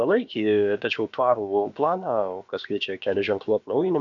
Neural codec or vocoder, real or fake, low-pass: codec, 16 kHz, about 1 kbps, DyCAST, with the encoder's durations; fake; 7.2 kHz